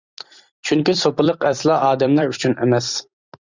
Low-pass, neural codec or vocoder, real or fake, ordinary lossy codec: 7.2 kHz; vocoder, 44.1 kHz, 128 mel bands every 256 samples, BigVGAN v2; fake; Opus, 64 kbps